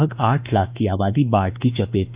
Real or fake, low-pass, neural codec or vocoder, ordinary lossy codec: fake; 3.6 kHz; autoencoder, 48 kHz, 32 numbers a frame, DAC-VAE, trained on Japanese speech; Opus, 64 kbps